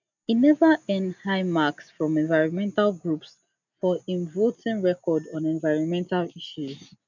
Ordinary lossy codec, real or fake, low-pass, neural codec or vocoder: none; real; 7.2 kHz; none